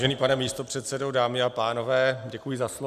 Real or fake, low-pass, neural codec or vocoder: real; 14.4 kHz; none